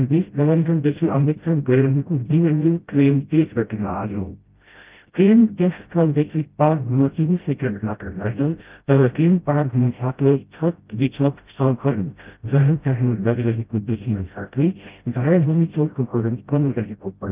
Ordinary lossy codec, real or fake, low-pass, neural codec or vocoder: Opus, 32 kbps; fake; 3.6 kHz; codec, 16 kHz, 0.5 kbps, FreqCodec, smaller model